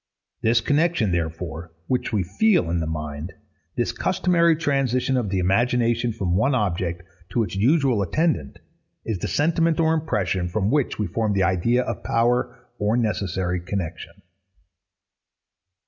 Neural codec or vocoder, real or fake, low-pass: none; real; 7.2 kHz